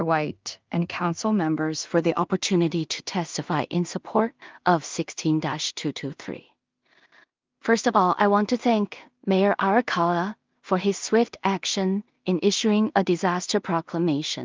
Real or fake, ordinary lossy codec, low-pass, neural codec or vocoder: fake; Opus, 32 kbps; 7.2 kHz; codec, 16 kHz in and 24 kHz out, 0.4 kbps, LongCat-Audio-Codec, two codebook decoder